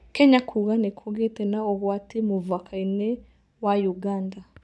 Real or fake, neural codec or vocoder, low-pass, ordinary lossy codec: real; none; none; none